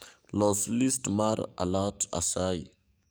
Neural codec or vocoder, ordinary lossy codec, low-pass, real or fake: codec, 44.1 kHz, 7.8 kbps, DAC; none; none; fake